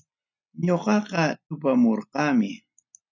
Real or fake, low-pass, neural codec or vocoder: real; 7.2 kHz; none